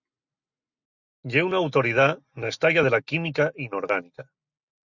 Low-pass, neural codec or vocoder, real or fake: 7.2 kHz; none; real